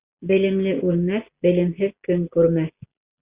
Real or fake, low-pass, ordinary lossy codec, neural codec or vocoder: real; 3.6 kHz; Opus, 64 kbps; none